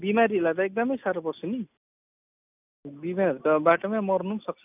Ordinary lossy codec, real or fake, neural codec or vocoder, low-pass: none; real; none; 3.6 kHz